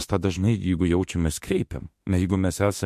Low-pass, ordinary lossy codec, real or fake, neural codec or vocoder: 14.4 kHz; MP3, 64 kbps; fake; autoencoder, 48 kHz, 32 numbers a frame, DAC-VAE, trained on Japanese speech